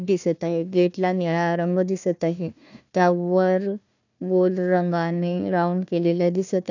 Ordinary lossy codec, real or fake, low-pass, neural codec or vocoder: none; fake; 7.2 kHz; codec, 16 kHz, 1 kbps, FunCodec, trained on Chinese and English, 50 frames a second